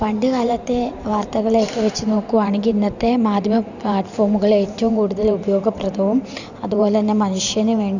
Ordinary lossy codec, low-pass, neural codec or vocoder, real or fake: none; 7.2 kHz; vocoder, 44.1 kHz, 128 mel bands every 512 samples, BigVGAN v2; fake